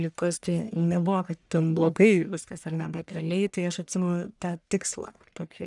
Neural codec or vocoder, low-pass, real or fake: codec, 44.1 kHz, 1.7 kbps, Pupu-Codec; 10.8 kHz; fake